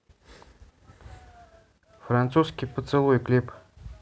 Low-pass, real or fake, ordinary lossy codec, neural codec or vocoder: none; real; none; none